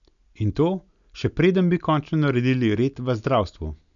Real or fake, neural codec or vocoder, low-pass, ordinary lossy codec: real; none; 7.2 kHz; none